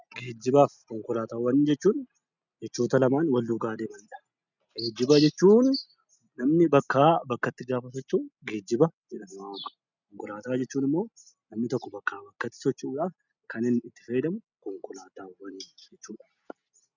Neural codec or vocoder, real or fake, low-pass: none; real; 7.2 kHz